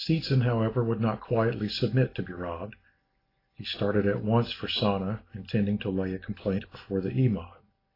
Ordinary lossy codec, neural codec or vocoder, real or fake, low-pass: AAC, 24 kbps; none; real; 5.4 kHz